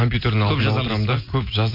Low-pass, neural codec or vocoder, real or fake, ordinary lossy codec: 5.4 kHz; none; real; MP3, 32 kbps